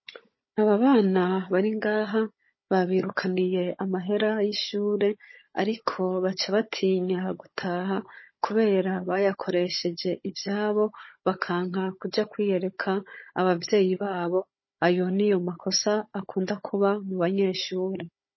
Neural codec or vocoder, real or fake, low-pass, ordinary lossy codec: codec, 16 kHz, 16 kbps, FunCodec, trained on Chinese and English, 50 frames a second; fake; 7.2 kHz; MP3, 24 kbps